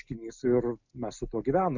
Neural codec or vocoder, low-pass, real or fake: none; 7.2 kHz; real